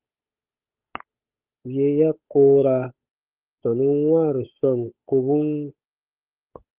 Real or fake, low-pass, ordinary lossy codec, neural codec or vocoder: fake; 3.6 kHz; Opus, 32 kbps; codec, 16 kHz, 8 kbps, FunCodec, trained on Chinese and English, 25 frames a second